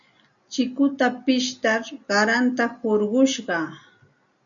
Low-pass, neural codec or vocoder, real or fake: 7.2 kHz; none; real